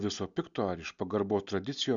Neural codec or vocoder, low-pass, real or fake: none; 7.2 kHz; real